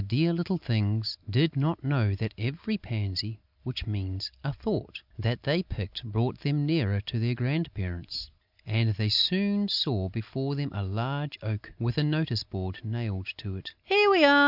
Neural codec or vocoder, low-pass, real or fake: none; 5.4 kHz; real